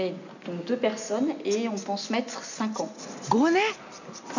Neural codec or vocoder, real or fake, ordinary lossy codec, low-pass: none; real; none; 7.2 kHz